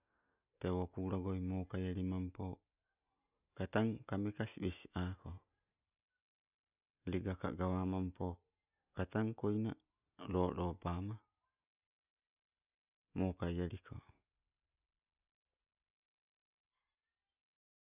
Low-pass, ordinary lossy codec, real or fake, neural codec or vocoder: 3.6 kHz; none; real; none